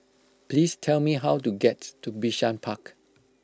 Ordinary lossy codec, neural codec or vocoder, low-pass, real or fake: none; none; none; real